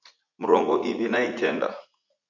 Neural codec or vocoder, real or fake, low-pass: vocoder, 44.1 kHz, 80 mel bands, Vocos; fake; 7.2 kHz